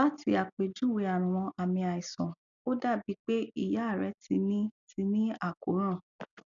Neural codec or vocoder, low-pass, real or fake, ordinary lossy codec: none; 7.2 kHz; real; none